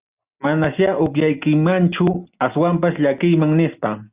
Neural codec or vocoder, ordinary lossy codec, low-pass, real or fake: none; Opus, 64 kbps; 3.6 kHz; real